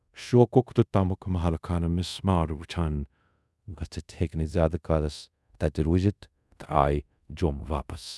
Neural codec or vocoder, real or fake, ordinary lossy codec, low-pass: codec, 24 kHz, 0.5 kbps, DualCodec; fake; none; none